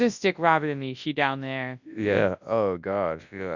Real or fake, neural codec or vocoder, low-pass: fake; codec, 24 kHz, 0.9 kbps, WavTokenizer, large speech release; 7.2 kHz